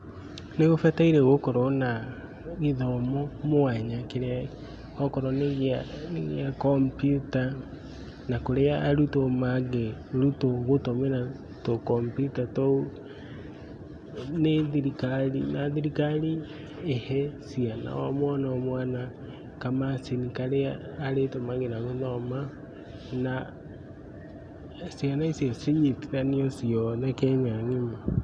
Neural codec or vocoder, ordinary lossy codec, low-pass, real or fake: none; none; none; real